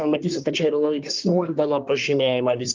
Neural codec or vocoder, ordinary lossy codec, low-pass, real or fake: codec, 24 kHz, 1 kbps, SNAC; Opus, 32 kbps; 7.2 kHz; fake